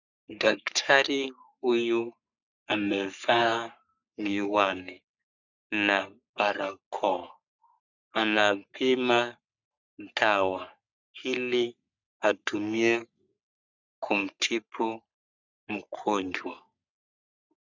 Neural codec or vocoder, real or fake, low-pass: codec, 44.1 kHz, 3.4 kbps, Pupu-Codec; fake; 7.2 kHz